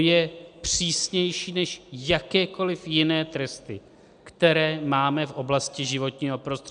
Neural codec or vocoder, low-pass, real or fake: none; 9.9 kHz; real